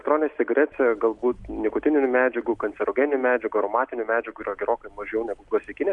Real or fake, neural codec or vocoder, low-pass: real; none; 10.8 kHz